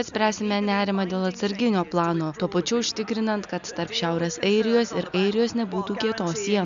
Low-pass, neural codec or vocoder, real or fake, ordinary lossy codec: 7.2 kHz; none; real; AAC, 96 kbps